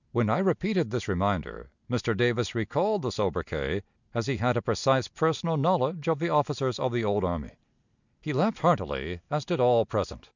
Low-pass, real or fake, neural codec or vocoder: 7.2 kHz; real; none